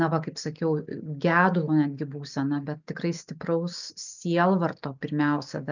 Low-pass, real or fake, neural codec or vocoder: 7.2 kHz; real; none